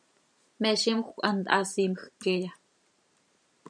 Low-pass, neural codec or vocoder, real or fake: 9.9 kHz; vocoder, 44.1 kHz, 128 mel bands every 512 samples, BigVGAN v2; fake